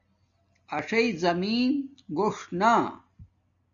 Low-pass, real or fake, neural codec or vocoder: 7.2 kHz; real; none